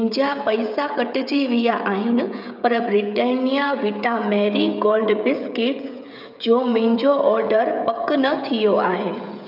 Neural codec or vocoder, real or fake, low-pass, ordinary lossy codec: codec, 16 kHz, 16 kbps, FreqCodec, smaller model; fake; 5.4 kHz; none